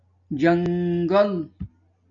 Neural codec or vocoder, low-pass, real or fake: none; 7.2 kHz; real